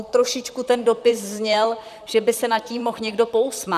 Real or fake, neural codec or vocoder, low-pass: fake; vocoder, 44.1 kHz, 128 mel bands, Pupu-Vocoder; 14.4 kHz